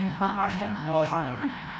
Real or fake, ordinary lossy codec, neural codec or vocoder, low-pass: fake; none; codec, 16 kHz, 0.5 kbps, FreqCodec, larger model; none